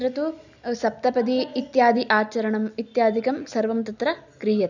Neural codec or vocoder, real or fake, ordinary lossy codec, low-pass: none; real; none; 7.2 kHz